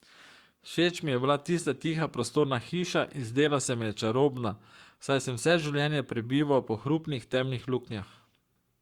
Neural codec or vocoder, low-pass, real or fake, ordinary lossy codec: codec, 44.1 kHz, 7.8 kbps, Pupu-Codec; 19.8 kHz; fake; Opus, 64 kbps